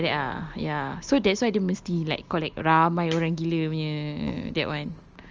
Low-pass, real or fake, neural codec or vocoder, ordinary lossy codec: 7.2 kHz; real; none; Opus, 24 kbps